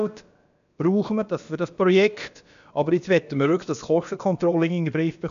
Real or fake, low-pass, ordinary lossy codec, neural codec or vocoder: fake; 7.2 kHz; MP3, 96 kbps; codec, 16 kHz, about 1 kbps, DyCAST, with the encoder's durations